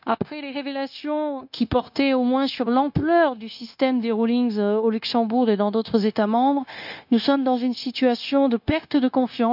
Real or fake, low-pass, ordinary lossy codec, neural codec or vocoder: fake; 5.4 kHz; none; codec, 16 kHz, 0.9 kbps, LongCat-Audio-Codec